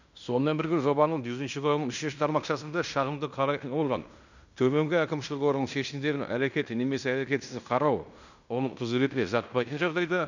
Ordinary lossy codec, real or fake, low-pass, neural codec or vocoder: none; fake; 7.2 kHz; codec, 16 kHz in and 24 kHz out, 0.9 kbps, LongCat-Audio-Codec, fine tuned four codebook decoder